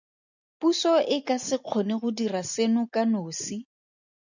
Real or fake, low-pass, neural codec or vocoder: real; 7.2 kHz; none